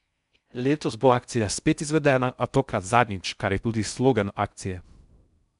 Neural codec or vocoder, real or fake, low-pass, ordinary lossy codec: codec, 16 kHz in and 24 kHz out, 0.6 kbps, FocalCodec, streaming, 4096 codes; fake; 10.8 kHz; none